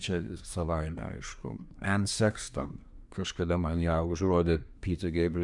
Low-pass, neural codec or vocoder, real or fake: 10.8 kHz; codec, 24 kHz, 1 kbps, SNAC; fake